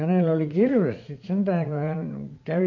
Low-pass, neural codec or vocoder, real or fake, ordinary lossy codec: 7.2 kHz; vocoder, 44.1 kHz, 80 mel bands, Vocos; fake; MP3, 48 kbps